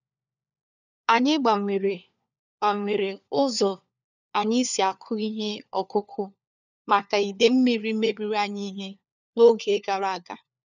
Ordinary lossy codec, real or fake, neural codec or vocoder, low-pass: none; fake; codec, 16 kHz, 4 kbps, FunCodec, trained on LibriTTS, 50 frames a second; 7.2 kHz